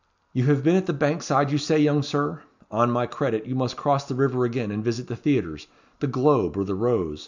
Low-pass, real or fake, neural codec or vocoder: 7.2 kHz; real; none